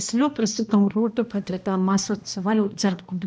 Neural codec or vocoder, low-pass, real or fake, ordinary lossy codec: codec, 16 kHz, 1 kbps, X-Codec, HuBERT features, trained on balanced general audio; none; fake; none